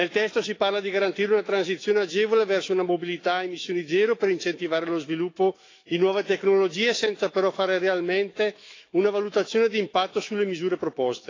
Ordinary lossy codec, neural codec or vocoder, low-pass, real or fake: AAC, 32 kbps; autoencoder, 48 kHz, 128 numbers a frame, DAC-VAE, trained on Japanese speech; 7.2 kHz; fake